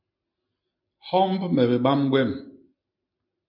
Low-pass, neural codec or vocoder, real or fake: 5.4 kHz; none; real